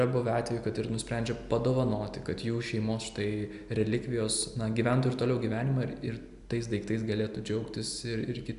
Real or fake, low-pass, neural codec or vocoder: real; 10.8 kHz; none